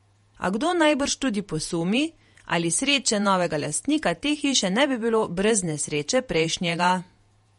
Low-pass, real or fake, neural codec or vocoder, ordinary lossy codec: 19.8 kHz; fake; vocoder, 48 kHz, 128 mel bands, Vocos; MP3, 48 kbps